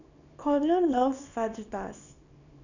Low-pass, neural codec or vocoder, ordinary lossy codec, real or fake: 7.2 kHz; codec, 24 kHz, 0.9 kbps, WavTokenizer, small release; none; fake